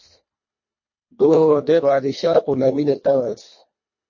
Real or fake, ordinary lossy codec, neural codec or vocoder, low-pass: fake; MP3, 32 kbps; codec, 24 kHz, 1.5 kbps, HILCodec; 7.2 kHz